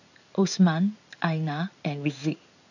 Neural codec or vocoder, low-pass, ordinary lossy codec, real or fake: codec, 16 kHz in and 24 kHz out, 1 kbps, XY-Tokenizer; 7.2 kHz; none; fake